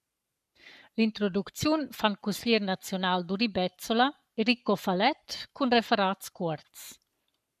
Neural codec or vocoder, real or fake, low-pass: codec, 44.1 kHz, 7.8 kbps, Pupu-Codec; fake; 14.4 kHz